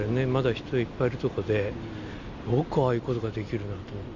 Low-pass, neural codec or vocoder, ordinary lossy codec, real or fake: 7.2 kHz; none; none; real